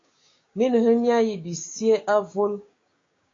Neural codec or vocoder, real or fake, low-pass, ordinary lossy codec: codec, 16 kHz, 6 kbps, DAC; fake; 7.2 kHz; AAC, 32 kbps